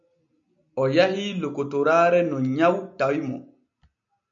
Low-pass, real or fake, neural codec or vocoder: 7.2 kHz; real; none